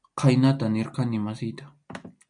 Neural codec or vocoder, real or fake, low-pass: none; real; 9.9 kHz